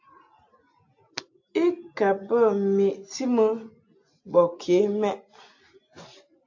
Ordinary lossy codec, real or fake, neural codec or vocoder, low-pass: AAC, 48 kbps; real; none; 7.2 kHz